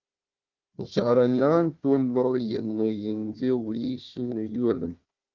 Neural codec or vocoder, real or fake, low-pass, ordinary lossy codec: codec, 16 kHz, 1 kbps, FunCodec, trained on Chinese and English, 50 frames a second; fake; 7.2 kHz; Opus, 24 kbps